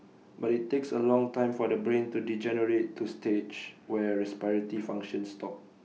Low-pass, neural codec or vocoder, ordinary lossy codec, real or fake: none; none; none; real